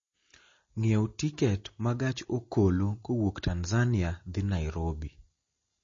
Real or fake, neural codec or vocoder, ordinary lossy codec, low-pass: real; none; MP3, 32 kbps; 7.2 kHz